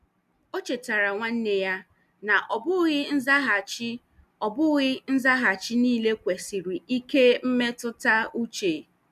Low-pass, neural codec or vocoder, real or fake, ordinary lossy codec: 14.4 kHz; none; real; none